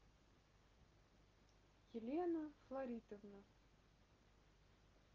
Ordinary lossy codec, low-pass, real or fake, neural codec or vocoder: Opus, 24 kbps; 7.2 kHz; real; none